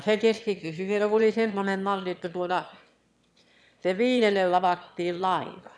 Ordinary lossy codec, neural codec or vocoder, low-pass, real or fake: none; autoencoder, 22.05 kHz, a latent of 192 numbers a frame, VITS, trained on one speaker; none; fake